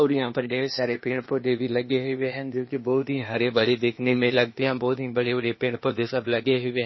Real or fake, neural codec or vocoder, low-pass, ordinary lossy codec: fake; codec, 16 kHz, 0.8 kbps, ZipCodec; 7.2 kHz; MP3, 24 kbps